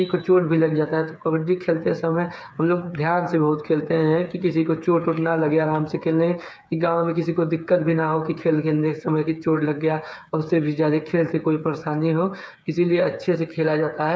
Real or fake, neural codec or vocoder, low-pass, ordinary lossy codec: fake; codec, 16 kHz, 8 kbps, FreqCodec, smaller model; none; none